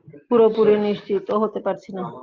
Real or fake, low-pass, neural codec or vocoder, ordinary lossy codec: real; 7.2 kHz; none; Opus, 24 kbps